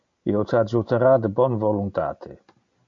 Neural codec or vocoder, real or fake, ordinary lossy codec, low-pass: none; real; MP3, 48 kbps; 7.2 kHz